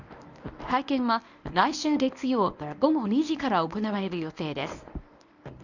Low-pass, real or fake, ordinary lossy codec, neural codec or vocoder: 7.2 kHz; fake; none; codec, 24 kHz, 0.9 kbps, WavTokenizer, medium speech release version 1